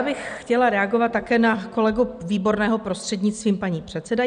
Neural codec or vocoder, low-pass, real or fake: none; 9.9 kHz; real